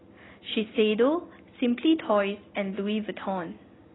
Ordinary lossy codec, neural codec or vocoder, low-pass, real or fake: AAC, 16 kbps; none; 7.2 kHz; real